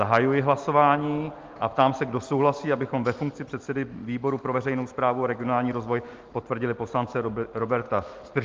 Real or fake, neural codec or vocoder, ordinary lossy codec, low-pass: real; none; Opus, 32 kbps; 7.2 kHz